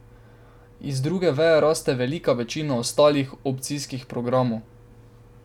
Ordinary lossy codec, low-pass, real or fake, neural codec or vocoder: none; 19.8 kHz; real; none